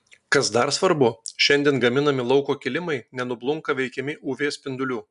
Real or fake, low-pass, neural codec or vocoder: real; 10.8 kHz; none